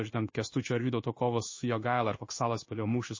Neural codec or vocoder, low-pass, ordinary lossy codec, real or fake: codec, 16 kHz in and 24 kHz out, 1 kbps, XY-Tokenizer; 7.2 kHz; MP3, 32 kbps; fake